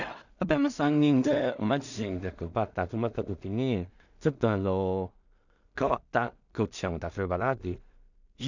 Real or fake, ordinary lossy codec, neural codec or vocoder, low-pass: fake; none; codec, 16 kHz in and 24 kHz out, 0.4 kbps, LongCat-Audio-Codec, two codebook decoder; 7.2 kHz